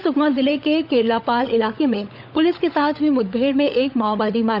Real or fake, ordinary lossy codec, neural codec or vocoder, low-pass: fake; none; codec, 16 kHz, 16 kbps, FunCodec, trained on LibriTTS, 50 frames a second; 5.4 kHz